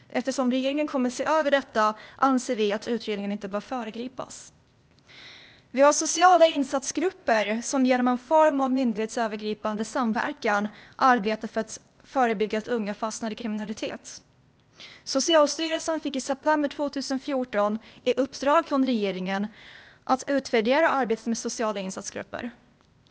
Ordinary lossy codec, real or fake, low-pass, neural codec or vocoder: none; fake; none; codec, 16 kHz, 0.8 kbps, ZipCodec